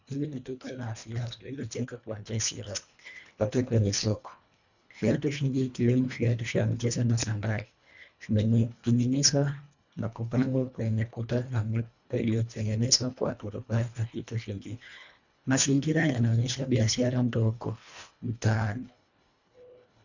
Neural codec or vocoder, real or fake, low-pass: codec, 24 kHz, 1.5 kbps, HILCodec; fake; 7.2 kHz